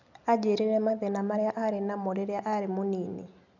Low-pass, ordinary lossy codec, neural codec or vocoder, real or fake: 7.2 kHz; none; none; real